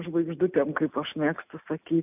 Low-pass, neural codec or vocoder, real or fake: 3.6 kHz; none; real